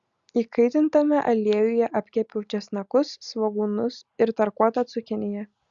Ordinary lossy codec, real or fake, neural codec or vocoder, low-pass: Opus, 64 kbps; real; none; 7.2 kHz